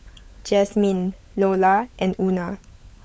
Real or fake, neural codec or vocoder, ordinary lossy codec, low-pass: fake; codec, 16 kHz, 16 kbps, FunCodec, trained on LibriTTS, 50 frames a second; none; none